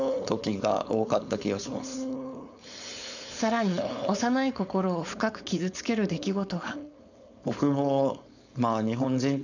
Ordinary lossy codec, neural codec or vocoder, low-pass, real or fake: none; codec, 16 kHz, 4.8 kbps, FACodec; 7.2 kHz; fake